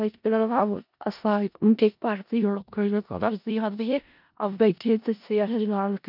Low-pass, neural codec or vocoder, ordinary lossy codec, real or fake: 5.4 kHz; codec, 16 kHz in and 24 kHz out, 0.4 kbps, LongCat-Audio-Codec, four codebook decoder; none; fake